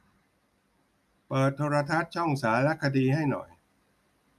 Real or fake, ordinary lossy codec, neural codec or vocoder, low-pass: real; none; none; 14.4 kHz